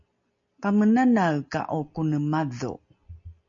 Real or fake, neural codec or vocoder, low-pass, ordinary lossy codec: real; none; 7.2 kHz; MP3, 48 kbps